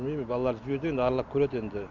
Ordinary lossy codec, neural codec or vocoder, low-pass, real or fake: none; none; 7.2 kHz; real